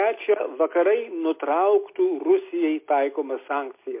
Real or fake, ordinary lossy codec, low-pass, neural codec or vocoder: real; MP3, 24 kbps; 3.6 kHz; none